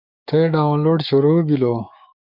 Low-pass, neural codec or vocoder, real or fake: 5.4 kHz; codec, 16 kHz, 6 kbps, DAC; fake